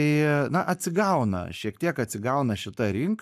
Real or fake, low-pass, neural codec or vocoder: fake; 14.4 kHz; codec, 44.1 kHz, 7.8 kbps, Pupu-Codec